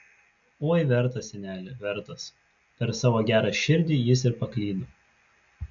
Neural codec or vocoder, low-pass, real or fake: none; 7.2 kHz; real